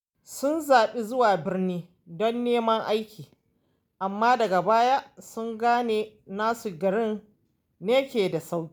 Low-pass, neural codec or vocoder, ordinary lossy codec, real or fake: none; none; none; real